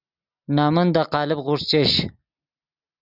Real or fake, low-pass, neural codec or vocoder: real; 5.4 kHz; none